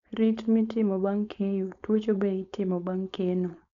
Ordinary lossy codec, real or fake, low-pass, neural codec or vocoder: none; fake; 7.2 kHz; codec, 16 kHz, 4.8 kbps, FACodec